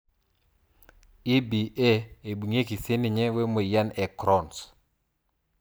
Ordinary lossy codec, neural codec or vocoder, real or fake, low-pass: none; none; real; none